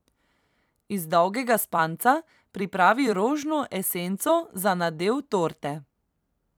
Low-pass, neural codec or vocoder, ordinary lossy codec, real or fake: none; vocoder, 44.1 kHz, 128 mel bands every 256 samples, BigVGAN v2; none; fake